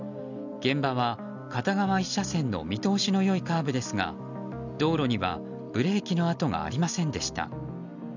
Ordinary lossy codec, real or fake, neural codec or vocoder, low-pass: none; real; none; 7.2 kHz